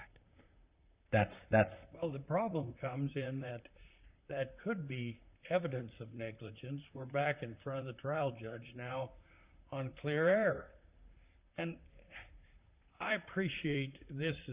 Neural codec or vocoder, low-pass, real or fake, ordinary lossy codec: vocoder, 44.1 kHz, 128 mel bands, Pupu-Vocoder; 3.6 kHz; fake; Opus, 64 kbps